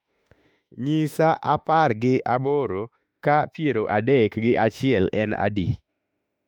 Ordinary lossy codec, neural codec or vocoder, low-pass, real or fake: MP3, 96 kbps; autoencoder, 48 kHz, 32 numbers a frame, DAC-VAE, trained on Japanese speech; 19.8 kHz; fake